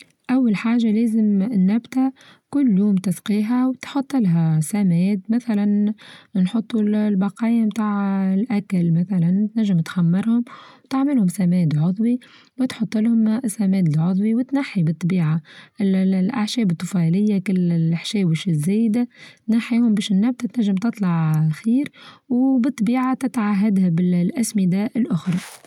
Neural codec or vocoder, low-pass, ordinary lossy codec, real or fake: none; 19.8 kHz; none; real